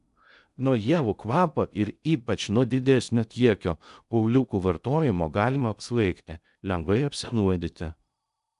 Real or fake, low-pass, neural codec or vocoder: fake; 10.8 kHz; codec, 16 kHz in and 24 kHz out, 0.6 kbps, FocalCodec, streaming, 2048 codes